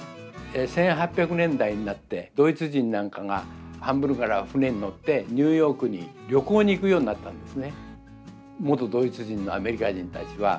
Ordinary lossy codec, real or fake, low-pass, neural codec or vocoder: none; real; none; none